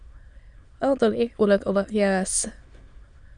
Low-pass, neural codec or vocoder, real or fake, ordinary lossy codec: 9.9 kHz; autoencoder, 22.05 kHz, a latent of 192 numbers a frame, VITS, trained on many speakers; fake; Opus, 64 kbps